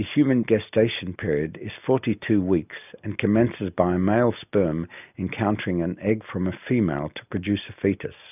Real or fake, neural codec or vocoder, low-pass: real; none; 3.6 kHz